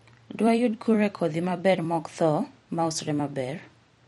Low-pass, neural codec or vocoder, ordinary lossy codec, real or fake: 19.8 kHz; vocoder, 44.1 kHz, 128 mel bands every 256 samples, BigVGAN v2; MP3, 48 kbps; fake